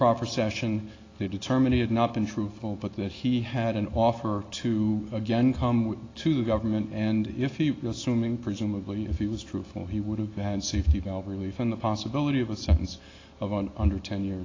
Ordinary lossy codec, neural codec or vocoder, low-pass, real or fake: AAC, 32 kbps; none; 7.2 kHz; real